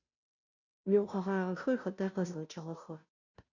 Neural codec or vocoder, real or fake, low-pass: codec, 16 kHz, 0.5 kbps, FunCodec, trained on Chinese and English, 25 frames a second; fake; 7.2 kHz